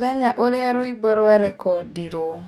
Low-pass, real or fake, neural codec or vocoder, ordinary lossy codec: 19.8 kHz; fake; codec, 44.1 kHz, 2.6 kbps, DAC; none